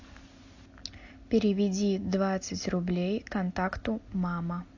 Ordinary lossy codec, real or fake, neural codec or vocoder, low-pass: AAC, 48 kbps; real; none; 7.2 kHz